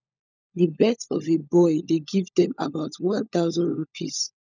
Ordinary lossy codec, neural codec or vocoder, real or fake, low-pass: none; codec, 16 kHz, 16 kbps, FunCodec, trained on LibriTTS, 50 frames a second; fake; 7.2 kHz